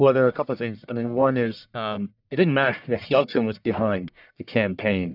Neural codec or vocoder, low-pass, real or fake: codec, 44.1 kHz, 1.7 kbps, Pupu-Codec; 5.4 kHz; fake